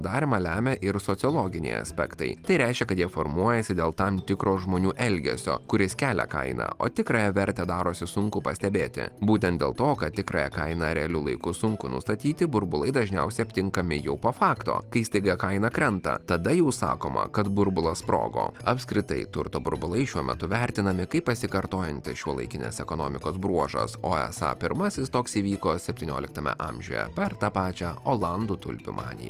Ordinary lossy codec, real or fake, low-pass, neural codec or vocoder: Opus, 32 kbps; real; 14.4 kHz; none